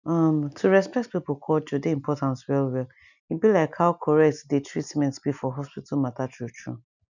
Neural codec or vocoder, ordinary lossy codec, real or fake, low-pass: none; none; real; 7.2 kHz